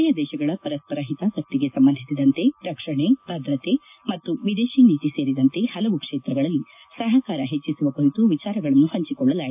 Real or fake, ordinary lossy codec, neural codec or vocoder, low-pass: real; AAC, 32 kbps; none; 3.6 kHz